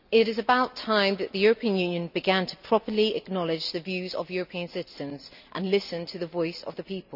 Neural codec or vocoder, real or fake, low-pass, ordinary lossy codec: none; real; 5.4 kHz; none